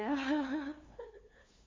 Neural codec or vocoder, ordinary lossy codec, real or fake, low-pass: codec, 16 kHz, 2 kbps, FunCodec, trained on LibriTTS, 25 frames a second; AAC, 32 kbps; fake; 7.2 kHz